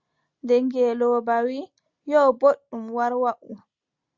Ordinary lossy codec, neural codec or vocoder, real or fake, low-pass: Opus, 64 kbps; none; real; 7.2 kHz